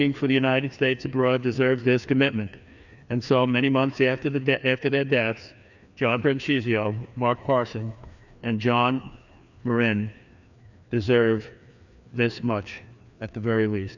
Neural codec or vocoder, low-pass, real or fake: codec, 16 kHz, 2 kbps, FreqCodec, larger model; 7.2 kHz; fake